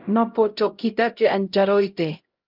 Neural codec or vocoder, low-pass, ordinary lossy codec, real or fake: codec, 16 kHz, 0.5 kbps, X-Codec, HuBERT features, trained on LibriSpeech; 5.4 kHz; Opus, 32 kbps; fake